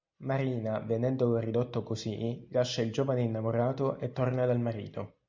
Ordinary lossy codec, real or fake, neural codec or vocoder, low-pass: MP3, 64 kbps; real; none; 7.2 kHz